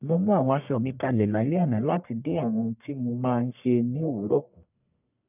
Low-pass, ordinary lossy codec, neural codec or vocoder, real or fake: 3.6 kHz; none; codec, 44.1 kHz, 1.7 kbps, Pupu-Codec; fake